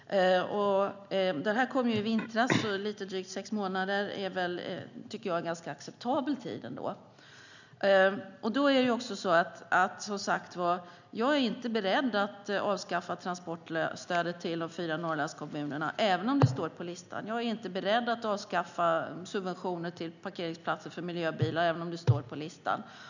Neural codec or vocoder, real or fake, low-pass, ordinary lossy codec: none; real; 7.2 kHz; none